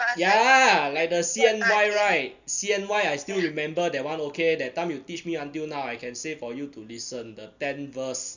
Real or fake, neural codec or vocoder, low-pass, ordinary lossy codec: real; none; 7.2 kHz; none